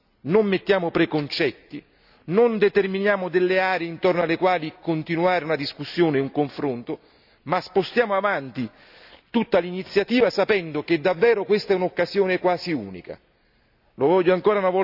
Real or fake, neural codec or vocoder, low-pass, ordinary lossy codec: real; none; 5.4 kHz; none